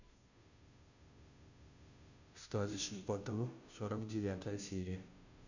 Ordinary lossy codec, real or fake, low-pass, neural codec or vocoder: none; fake; 7.2 kHz; codec, 16 kHz, 0.5 kbps, FunCodec, trained on Chinese and English, 25 frames a second